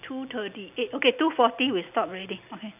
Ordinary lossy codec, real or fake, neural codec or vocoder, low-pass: none; real; none; 3.6 kHz